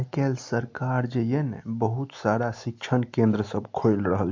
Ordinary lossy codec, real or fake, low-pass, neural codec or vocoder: MP3, 48 kbps; real; 7.2 kHz; none